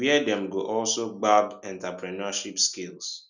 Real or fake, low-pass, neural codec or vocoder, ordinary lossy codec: real; 7.2 kHz; none; none